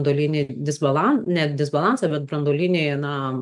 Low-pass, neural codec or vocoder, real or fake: 10.8 kHz; none; real